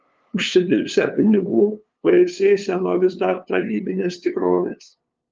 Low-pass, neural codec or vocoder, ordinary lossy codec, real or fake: 7.2 kHz; codec, 16 kHz, 2 kbps, FunCodec, trained on LibriTTS, 25 frames a second; Opus, 24 kbps; fake